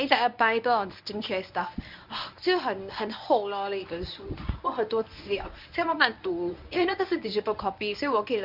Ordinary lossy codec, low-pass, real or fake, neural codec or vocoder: none; 5.4 kHz; fake; codec, 24 kHz, 0.9 kbps, WavTokenizer, medium speech release version 1